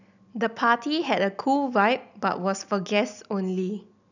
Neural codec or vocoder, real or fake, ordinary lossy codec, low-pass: none; real; none; 7.2 kHz